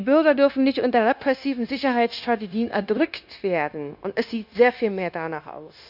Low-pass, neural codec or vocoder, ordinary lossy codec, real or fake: 5.4 kHz; codec, 16 kHz, 0.9 kbps, LongCat-Audio-Codec; none; fake